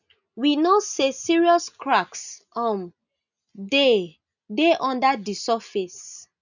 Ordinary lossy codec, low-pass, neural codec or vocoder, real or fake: none; 7.2 kHz; none; real